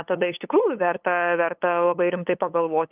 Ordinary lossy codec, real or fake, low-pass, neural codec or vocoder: Opus, 32 kbps; fake; 3.6 kHz; codec, 16 kHz, 8 kbps, FunCodec, trained on LibriTTS, 25 frames a second